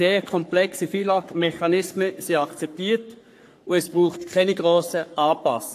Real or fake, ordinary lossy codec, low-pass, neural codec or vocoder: fake; AAC, 64 kbps; 14.4 kHz; codec, 44.1 kHz, 3.4 kbps, Pupu-Codec